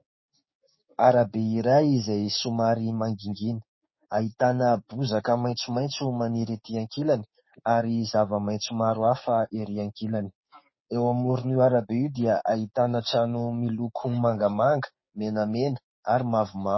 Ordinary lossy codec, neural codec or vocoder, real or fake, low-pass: MP3, 24 kbps; codec, 16 kHz, 6 kbps, DAC; fake; 7.2 kHz